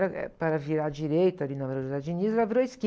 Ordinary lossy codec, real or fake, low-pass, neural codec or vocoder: none; real; none; none